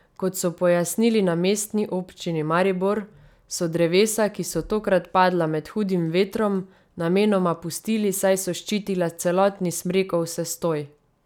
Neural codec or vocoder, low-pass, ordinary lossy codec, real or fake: none; 19.8 kHz; none; real